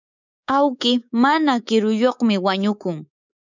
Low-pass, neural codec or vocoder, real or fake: 7.2 kHz; codec, 24 kHz, 3.1 kbps, DualCodec; fake